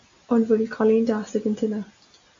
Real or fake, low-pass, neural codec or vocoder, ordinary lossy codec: real; 7.2 kHz; none; MP3, 48 kbps